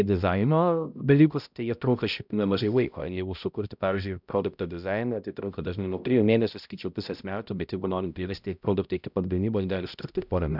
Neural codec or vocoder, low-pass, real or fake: codec, 16 kHz, 0.5 kbps, X-Codec, HuBERT features, trained on balanced general audio; 5.4 kHz; fake